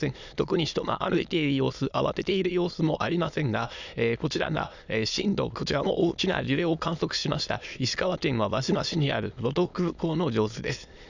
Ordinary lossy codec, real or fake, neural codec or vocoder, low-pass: none; fake; autoencoder, 22.05 kHz, a latent of 192 numbers a frame, VITS, trained on many speakers; 7.2 kHz